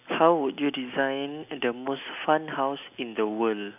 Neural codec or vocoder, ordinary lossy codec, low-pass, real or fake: none; none; 3.6 kHz; real